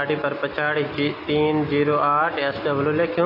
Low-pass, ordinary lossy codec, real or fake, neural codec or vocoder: 5.4 kHz; AAC, 24 kbps; real; none